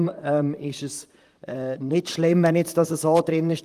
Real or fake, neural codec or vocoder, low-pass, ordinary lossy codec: fake; vocoder, 44.1 kHz, 128 mel bands, Pupu-Vocoder; 14.4 kHz; Opus, 32 kbps